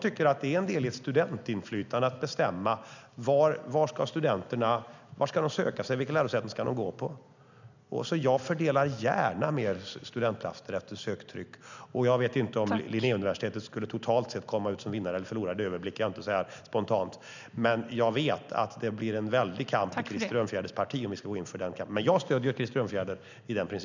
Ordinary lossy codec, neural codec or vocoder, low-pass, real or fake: none; none; 7.2 kHz; real